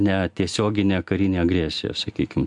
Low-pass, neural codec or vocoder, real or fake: 10.8 kHz; none; real